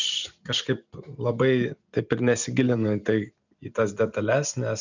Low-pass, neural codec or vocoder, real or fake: 7.2 kHz; none; real